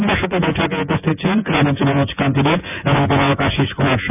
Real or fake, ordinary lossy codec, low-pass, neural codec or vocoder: fake; none; 3.6 kHz; vocoder, 24 kHz, 100 mel bands, Vocos